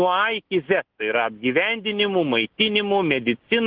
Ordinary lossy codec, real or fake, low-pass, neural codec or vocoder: Opus, 16 kbps; real; 5.4 kHz; none